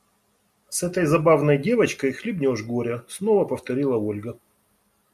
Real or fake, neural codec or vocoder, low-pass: real; none; 14.4 kHz